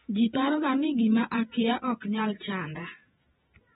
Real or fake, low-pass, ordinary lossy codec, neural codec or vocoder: fake; 19.8 kHz; AAC, 16 kbps; vocoder, 48 kHz, 128 mel bands, Vocos